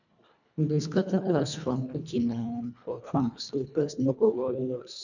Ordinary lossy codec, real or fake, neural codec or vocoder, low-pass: none; fake; codec, 24 kHz, 1.5 kbps, HILCodec; 7.2 kHz